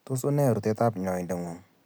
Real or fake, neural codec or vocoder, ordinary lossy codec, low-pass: real; none; none; none